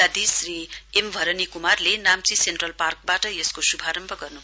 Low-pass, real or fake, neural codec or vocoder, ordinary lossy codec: none; real; none; none